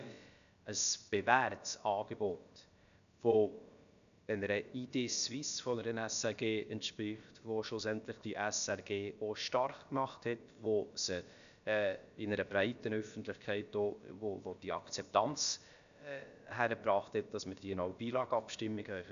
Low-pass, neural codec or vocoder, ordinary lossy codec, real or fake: 7.2 kHz; codec, 16 kHz, about 1 kbps, DyCAST, with the encoder's durations; none; fake